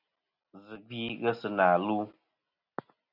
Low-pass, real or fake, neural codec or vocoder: 5.4 kHz; real; none